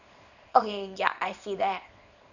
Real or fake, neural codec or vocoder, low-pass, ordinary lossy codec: fake; codec, 24 kHz, 0.9 kbps, WavTokenizer, small release; 7.2 kHz; none